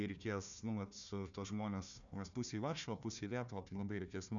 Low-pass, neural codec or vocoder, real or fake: 7.2 kHz; codec, 16 kHz, 1 kbps, FunCodec, trained on Chinese and English, 50 frames a second; fake